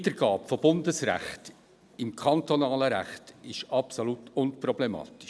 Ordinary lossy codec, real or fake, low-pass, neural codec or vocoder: none; real; none; none